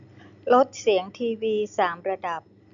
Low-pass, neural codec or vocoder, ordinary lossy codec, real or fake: 7.2 kHz; none; none; real